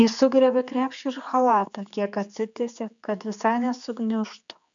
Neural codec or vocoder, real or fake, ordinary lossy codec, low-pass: codec, 16 kHz, 4 kbps, X-Codec, HuBERT features, trained on general audio; fake; AAC, 64 kbps; 7.2 kHz